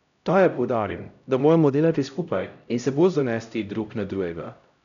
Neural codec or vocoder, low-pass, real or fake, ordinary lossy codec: codec, 16 kHz, 0.5 kbps, X-Codec, HuBERT features, trained on LibriSpeech; 7.2 kHz; fake; none